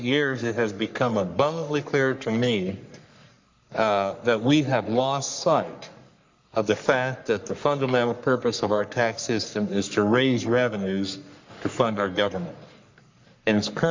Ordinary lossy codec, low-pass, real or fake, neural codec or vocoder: MP3, 64 kbps; 7.2 kHz; fake; codec, 44.1 kHz, 3.4 kbps, Pupu-Codec